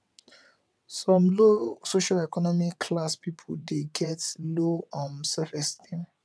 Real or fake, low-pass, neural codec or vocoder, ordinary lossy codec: fake; none; vocoder, 22.05 kHz, 80 mel bands, WaveNeXt; none